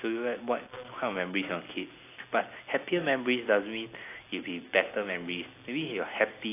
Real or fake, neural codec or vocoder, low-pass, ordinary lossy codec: real; none; 3.6 kHz; AAC, 24 kbps